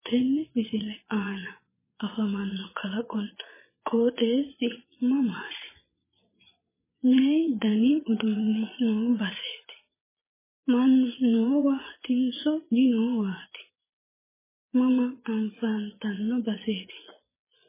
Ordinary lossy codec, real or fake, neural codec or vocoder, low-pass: MP3, 16 kbps; fake; vocoder, 22.05 kHz, 80 mel bands, Vocos; 3.6 kHz